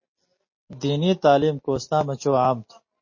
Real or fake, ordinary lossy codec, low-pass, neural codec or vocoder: real; MP3, 32 kbps; 7.2 kHz; none